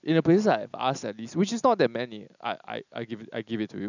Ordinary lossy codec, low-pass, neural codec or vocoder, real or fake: none; 7.2 kHz; none; real